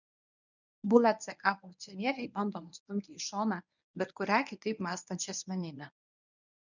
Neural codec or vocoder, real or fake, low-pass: codec, 24 kHz, 0.9 kbps, WavTokenizer, medium speech release version 1; fake; 7.2 kHz